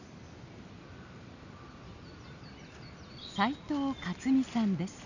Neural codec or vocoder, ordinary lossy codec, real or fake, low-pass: none; none; real; 7.2 kHz